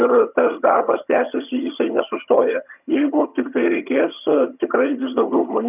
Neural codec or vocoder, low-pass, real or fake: vocoder, 22.05 kHz, 80 mel bands, HiFi-GAN; 3.6 kHz; fake